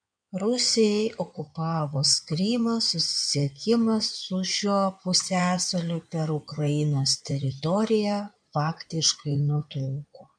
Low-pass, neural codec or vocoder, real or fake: 9.9 kHz; codec, 16 kHz in and 24 kHz out, 2.2 kbps, FireRedTTS-2 codec; fake